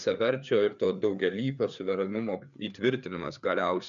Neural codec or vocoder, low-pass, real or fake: codec, 16 kHz, 4 kbps, FreqCodec, larger model; 7.2 kHz; fake